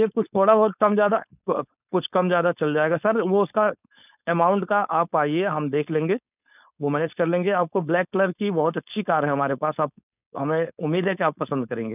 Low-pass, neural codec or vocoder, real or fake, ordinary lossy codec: 3.6 kHz; codec, 16 kHz, 4.8 kbps, FACodec; fake; none